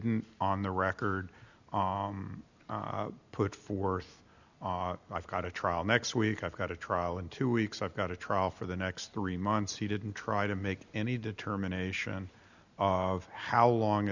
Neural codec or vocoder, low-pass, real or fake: none; 7.2 kHz; real